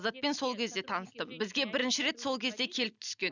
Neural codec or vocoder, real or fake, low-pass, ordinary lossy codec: none; real; 7.2 kHz; none